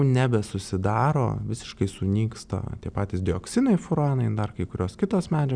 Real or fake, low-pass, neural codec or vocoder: real; 9.9 kHz; none